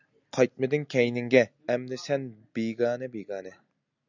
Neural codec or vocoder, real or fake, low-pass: none; real; 7.2 kHz